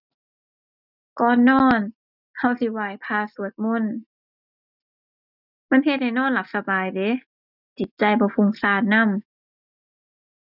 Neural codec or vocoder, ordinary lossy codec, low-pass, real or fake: none; none; 5.4 kHz; real